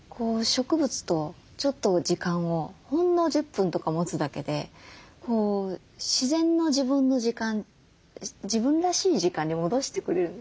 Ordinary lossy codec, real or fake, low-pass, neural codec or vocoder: none; real; none; none